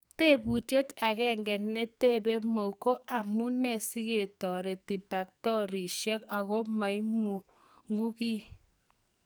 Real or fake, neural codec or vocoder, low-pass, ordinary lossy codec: fake; codec, 44.1 kHz, 2.6 kbps, SNAC; none; none